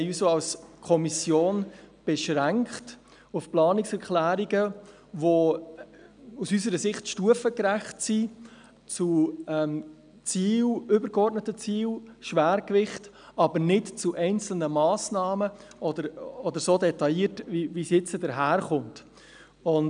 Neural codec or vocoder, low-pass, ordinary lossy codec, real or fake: none; 9.9 kHz; none; real